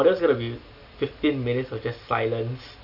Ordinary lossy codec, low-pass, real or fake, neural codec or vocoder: none; 5.4 kHz; real; none